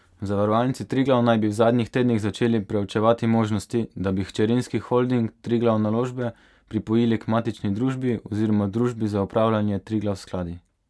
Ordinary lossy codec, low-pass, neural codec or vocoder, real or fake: none; none; none; real